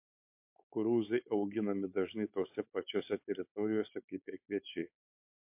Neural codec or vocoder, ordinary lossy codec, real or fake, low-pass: codec, 16 kHz, 4.8 kbps, FACodec; MP3, 32 kbps; fake; 3.6 kHz